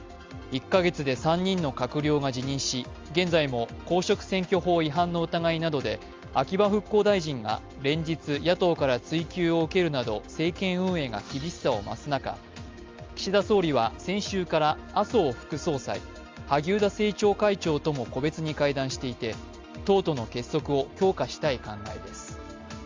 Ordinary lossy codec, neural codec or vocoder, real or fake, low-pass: Opus, 32 kbps; none; real; 7.2 kHz